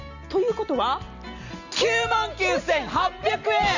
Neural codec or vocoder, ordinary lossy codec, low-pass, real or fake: none; none; 7.2 kHz; real